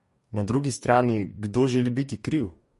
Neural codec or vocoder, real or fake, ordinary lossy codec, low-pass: codec, 44.1 kHz, 2.6 kbps, DAC; fake; MP3, 48 kbps; 14.4 kHz